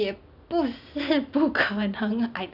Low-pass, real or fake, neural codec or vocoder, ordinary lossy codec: 5.4 kHz; real; none; none